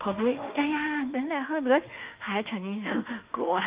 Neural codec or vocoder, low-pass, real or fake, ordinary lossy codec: autoencoder, 48 kHz, 32 numbers a frame, DAC-VAE, trained on Japanese speech; 3.6 kHz; fake; Opus, 32 kbps